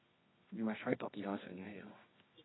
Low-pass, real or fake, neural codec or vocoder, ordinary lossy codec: 7.2 kHz; fake; codec, 24 kHz, 0.9 kbps, WavTokenizer, medium music audio release; AAC, 16 kbps